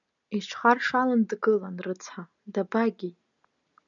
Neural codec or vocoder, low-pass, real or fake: none; 7.2 kHz; real